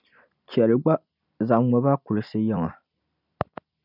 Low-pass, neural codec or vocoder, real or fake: 5.4 kHz; vocoder, 44.1 kHz, 128 mel bands every 256 samples, BigVGAN v2; fake